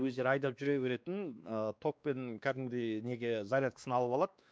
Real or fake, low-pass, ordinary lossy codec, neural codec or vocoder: fake; none; none; codec, 16 kHz, 2 kbps, X-Codec, WavLM features, trained on Multilingual LibriSpeech